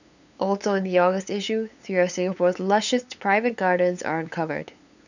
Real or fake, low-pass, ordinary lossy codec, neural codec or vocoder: fake; 7.2 kHz; none; codec, 16 kHz, 8 kbps, FunCodec, trained on LibriTTS, 25 frames a second